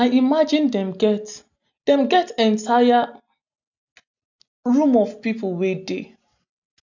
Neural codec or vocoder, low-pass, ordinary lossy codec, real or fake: none; 7.2 kHz; none; real